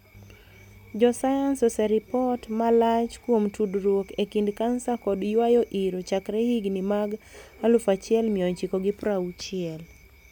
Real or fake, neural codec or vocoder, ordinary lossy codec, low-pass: real; none; none; 19.8 kHz